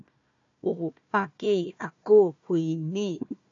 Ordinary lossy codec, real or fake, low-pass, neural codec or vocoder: AAC, 48 kbps; fake; 7.2 kHz; codec, 16 kHz, 1 kbps, FunCodec, trained on Chinese and English, 50 frames a second